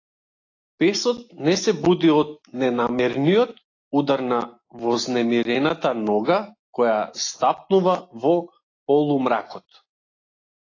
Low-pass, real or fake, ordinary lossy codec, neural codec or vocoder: 7.2 kHz; real; AAC, 32 kbps; none